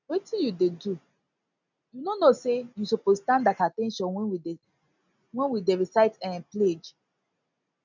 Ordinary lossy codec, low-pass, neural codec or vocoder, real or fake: none; 7.2 kHz; none; real